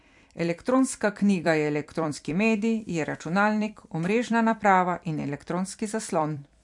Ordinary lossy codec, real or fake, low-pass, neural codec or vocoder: MP3, 64 kbps; fake; 10.8 kHz; vocoder, 44.1 kHz, 128 mel bands every 256 samples, BigVGAN v2